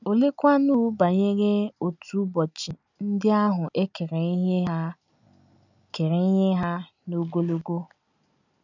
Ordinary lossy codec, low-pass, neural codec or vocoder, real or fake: none; 7.2 kHz; none; real